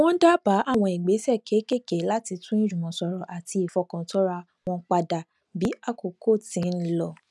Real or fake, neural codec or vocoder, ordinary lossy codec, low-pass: real; none; none; none